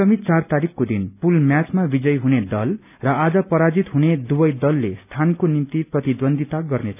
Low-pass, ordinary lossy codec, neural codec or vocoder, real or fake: 3.6 kHz; none; none; real